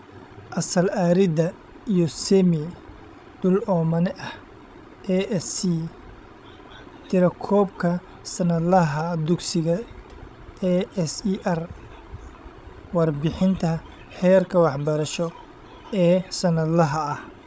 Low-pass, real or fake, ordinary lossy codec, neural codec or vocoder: none; fake; none; codec, 16 kHz, 16 kbps, FreqCodec, larger model